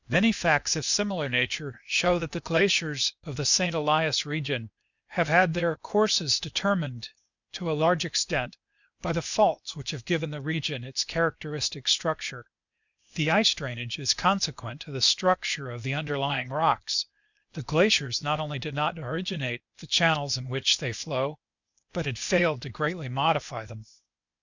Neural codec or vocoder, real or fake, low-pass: codec, 16 kHz, 0.8 kbps, ZipCodec; fake; 7.2 kHz